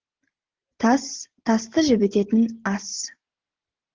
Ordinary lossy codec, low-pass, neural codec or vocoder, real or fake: Opus, 16 kbps; 7.2 kHz; none; real